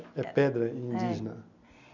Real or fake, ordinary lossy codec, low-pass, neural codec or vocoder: real; none; 7.2 kHz; none